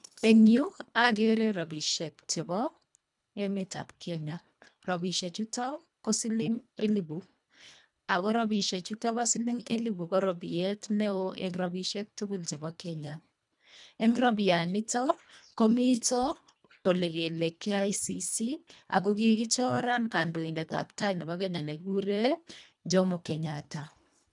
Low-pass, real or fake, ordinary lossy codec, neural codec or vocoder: 10.8 kHz; fake; none; codec, 24 kHz, 1.5 kbps, HILCodec